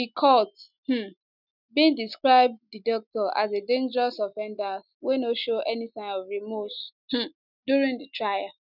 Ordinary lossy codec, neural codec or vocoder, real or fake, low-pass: none; none; real; 5.4 kHz